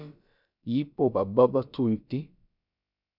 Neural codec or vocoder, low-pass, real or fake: codec, 16 kHz, about 1 kbps, DyCAST, with the encoder's durations; 5.4 kHz; fake